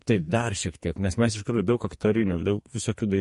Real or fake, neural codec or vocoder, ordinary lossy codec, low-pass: fake; codec, 44.1 kHz, 2.6 kbps, SNAC; MP3, 48 kbps; 14.4 kHz